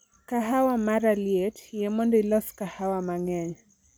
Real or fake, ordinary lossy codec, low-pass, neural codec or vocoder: real; none; none; none